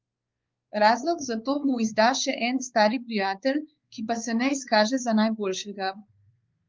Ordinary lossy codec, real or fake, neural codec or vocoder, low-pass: Opus, 32 kbps; fake; codec, 16 kHz, 4 kbps, X-Codec, WavLM features, trained on Multilingual LibriSpeech; 7.2 kHz